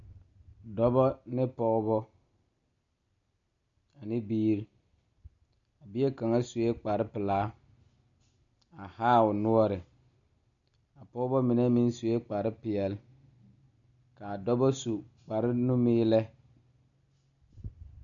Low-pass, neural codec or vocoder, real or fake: 7.2 kHz; none; real